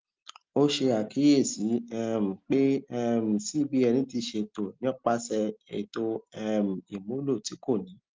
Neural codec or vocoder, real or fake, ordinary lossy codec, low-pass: none; real; Opus, 32 kbps; 7.2 kHz